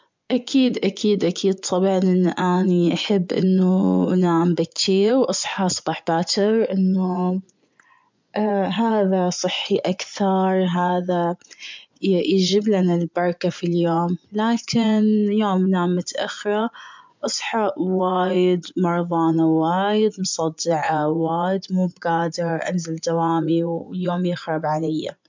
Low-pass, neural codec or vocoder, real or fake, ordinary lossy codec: 7.2 kHz; vocoder, 24 kHz, 100 mel bands, Vocos; fake; MP3, 64 kbps